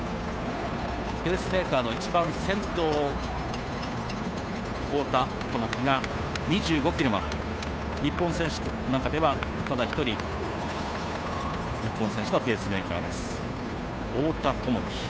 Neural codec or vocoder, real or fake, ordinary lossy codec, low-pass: codec, 16 kHz, 2 kbps, FunCodec, trained on Chinese and English, 25 frames a second; fake; none; none